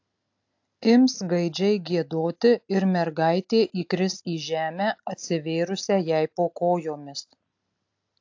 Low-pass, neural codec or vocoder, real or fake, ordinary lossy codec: 7.2 kHz; none; real; AAC, 48 kbps